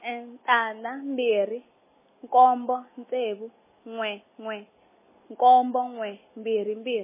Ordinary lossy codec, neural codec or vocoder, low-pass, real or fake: MP3, 16 kbps; none; 3.6 kHz; real